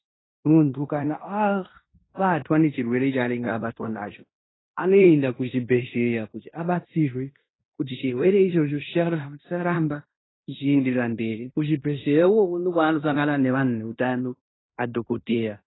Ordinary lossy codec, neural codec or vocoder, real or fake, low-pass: AAC, 16 kbps; codec, 16 kHz in and 24 kHz out, 0.9 kbps, LongCat-Audio-Codec, fine tuned four codebook decoder; fake; 7.2 kHz